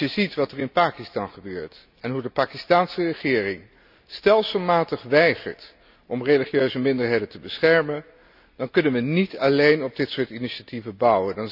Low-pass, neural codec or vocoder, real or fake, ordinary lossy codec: 5.4 kHz; none; real; none